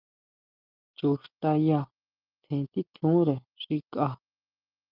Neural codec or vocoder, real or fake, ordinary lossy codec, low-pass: none; real; Opus, 32 kbps; 5.4 kHz